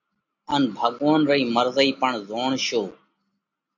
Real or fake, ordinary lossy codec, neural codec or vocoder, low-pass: real; MP3, 48 kbps; none; 7.2 kHz